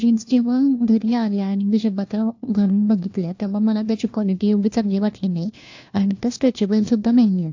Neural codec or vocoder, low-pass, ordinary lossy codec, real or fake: codec, 16 kHz, 1 kbps, FunCodec, trained on LibriTTS, 50 frames a second; 7.2 kHz; AAC, 48 kbps; fake